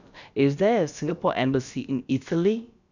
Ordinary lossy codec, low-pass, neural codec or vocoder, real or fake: none; 7.2 kHz; codec, 16 kHz, about 1 kbps, DyCAST, with the encoder's durations; fake